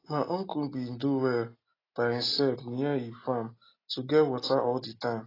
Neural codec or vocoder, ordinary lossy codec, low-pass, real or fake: none; AAC, 24 kbps; 5.4 kHz; real